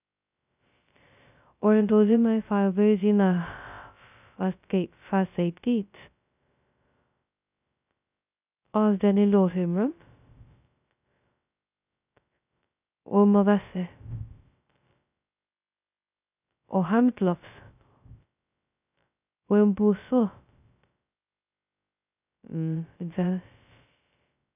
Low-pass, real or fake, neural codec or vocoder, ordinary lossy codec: 3.6 kHz; fake; codec, 16 kHz, 0.2 kbps, FocalCodec; none